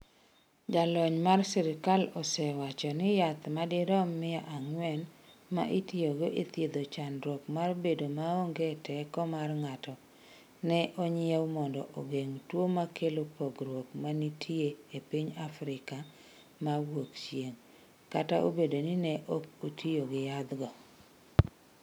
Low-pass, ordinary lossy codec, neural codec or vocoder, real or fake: none; none; none; real